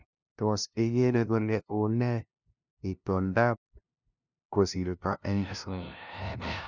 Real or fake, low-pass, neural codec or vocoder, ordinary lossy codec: fake; 7.2 kHz; codec, 16 kHz, 0.5 kbps, FunCodec, trained on LibriTTS, 25 frames a second; none